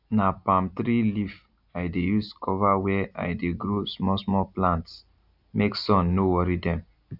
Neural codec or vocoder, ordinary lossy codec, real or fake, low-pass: none; none; real; 5.4 kHz